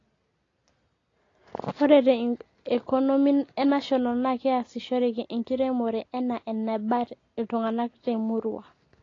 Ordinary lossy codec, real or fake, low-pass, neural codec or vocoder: AAC, 32 kbps; real; 7.2 kHz; none